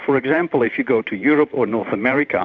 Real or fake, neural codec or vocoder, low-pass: fake; vocoder, 44.1 kHz, 128 mel bands every 512 samples, BigVGAN v2; 7.2 kHz